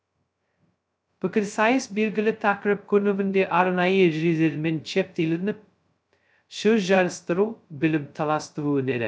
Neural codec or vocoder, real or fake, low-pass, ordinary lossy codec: codec, 16 kHz, 0.2 kbps, FocalCodec; fake; none; none